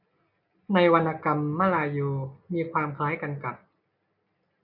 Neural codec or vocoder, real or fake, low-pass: none; real; 5.4 kHz